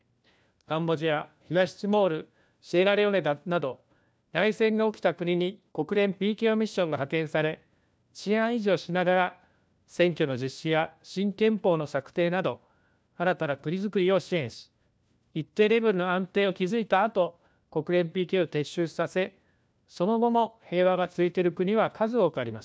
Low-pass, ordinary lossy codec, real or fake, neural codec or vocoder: none; none; fake; codec, 16 kHz, 1 kbps, FunCodec, trained on LibriTTS, 50 frames a second